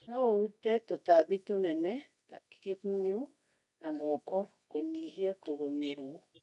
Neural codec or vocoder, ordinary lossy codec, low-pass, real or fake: codec, 24 kHz, 0.9 kbps, WavTokenizer, medium music audio release; none; 10.8 kHz; fake